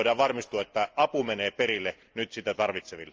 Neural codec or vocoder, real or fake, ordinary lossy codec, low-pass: none; real; Opus, 24 kbps; 7.2 kHz